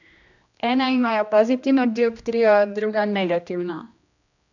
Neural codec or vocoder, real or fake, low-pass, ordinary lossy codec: codec, 16 kHz, 1 kbps, X-Codec, HuBERT features, trained on general audio; fake; 7.2 kHz; none